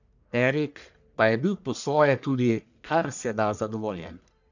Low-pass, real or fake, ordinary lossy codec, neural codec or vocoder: 7.2 kHz; fake; none; codec, 44.1 kHz, 1.7 kbps, Pupu-Codec